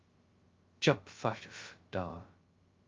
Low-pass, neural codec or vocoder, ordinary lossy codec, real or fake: 7.2 kHz; codec, 16 kHz, 0.2 kbps, FocalCodec; Opus, 32 kbps; fake